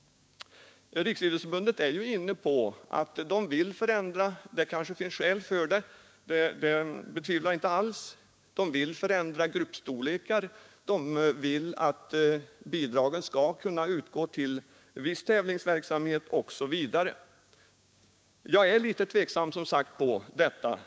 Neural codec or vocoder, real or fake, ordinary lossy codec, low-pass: codec, 16 kHz, 6 kbps, DAC; fake; none; none